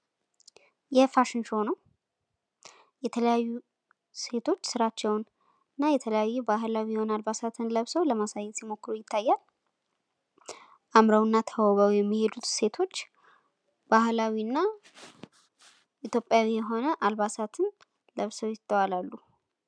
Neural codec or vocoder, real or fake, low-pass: none; real; 9.9 kHz